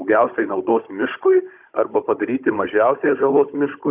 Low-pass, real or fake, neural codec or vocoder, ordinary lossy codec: 3.6 kHz; fake; codec, 16 kHz, 16 kbps, FunCodec, trained on Chinese and English, 50 frames a second; Opus, 64 kbps